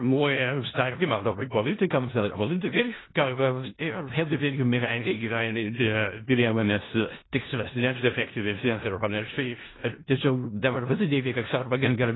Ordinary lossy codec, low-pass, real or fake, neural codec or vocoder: AAC, 16 kbps; 7.2 kHz; fake; codec, 16 kHz in and 24 kHz out, 0.4 kbps, LongCat-Audio-Codec, four codebook decoder